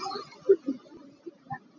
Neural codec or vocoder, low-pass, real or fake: none; 7.2 kHz; real